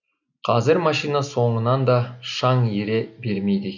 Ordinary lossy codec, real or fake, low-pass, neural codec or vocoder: none; real; 7.2 kHz; none